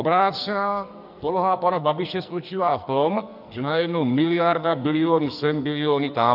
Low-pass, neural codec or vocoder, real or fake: 5.4 kHz; codec, 44.1 kHz, 2.6 kbps, SNAC; fake